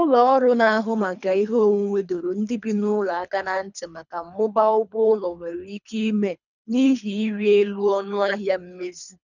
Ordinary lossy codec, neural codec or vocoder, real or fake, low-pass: none; codec, 24 kHz, 3 kbps, HILCodec; fake; 7.2 kHz